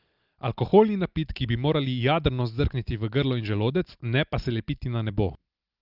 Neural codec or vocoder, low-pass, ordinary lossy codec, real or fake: none; 5.4 kHz; Opus, 32 kbps; real